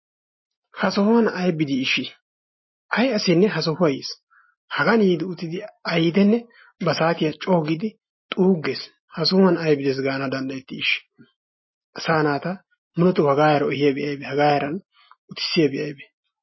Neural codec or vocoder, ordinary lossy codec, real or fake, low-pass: none; MP3, 24 kbps; real; 7.2 kHz